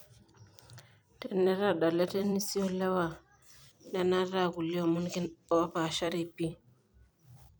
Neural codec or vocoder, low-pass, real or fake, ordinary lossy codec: vocoder, 44.1 kHz, 128 mel bands every 256 samples, BigVGAN v2; none; fake; none